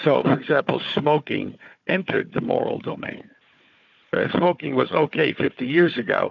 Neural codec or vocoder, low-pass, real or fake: codec, 16 kHz, 4 kbps, FunCodec, trained on Chinese and English, 50 frames a second; 7.2 kHz; fake